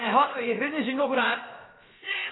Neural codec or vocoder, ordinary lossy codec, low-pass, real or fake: codec, 16 kHz, about 1 kbps, DyCAST, with the encoder's durations; AAC, 16 kbps; 7.2 kHz; fake